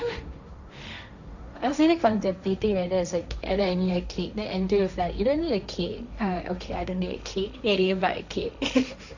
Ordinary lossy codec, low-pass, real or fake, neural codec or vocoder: none; 7.2 kHz; fake; codec, 16 kHz, 1.1 kbps, Voila-Tokenizer